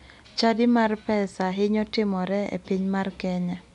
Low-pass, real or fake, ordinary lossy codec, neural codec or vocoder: 10.8 kHz; real; none; none